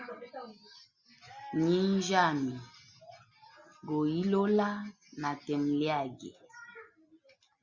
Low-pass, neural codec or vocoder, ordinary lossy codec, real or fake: 7.2 kHz; none; Opus, 64 kbps; real